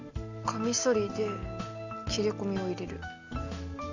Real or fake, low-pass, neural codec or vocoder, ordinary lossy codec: real; 7.2 kHz; none; Opus, 64 kbps